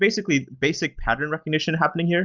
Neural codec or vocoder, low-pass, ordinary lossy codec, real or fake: none; 7.2 kHz; Opus, 24 kbps; real